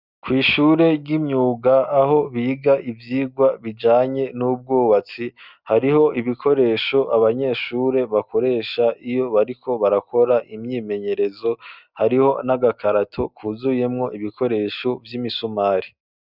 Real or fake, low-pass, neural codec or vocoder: real; 5.4 kHz; none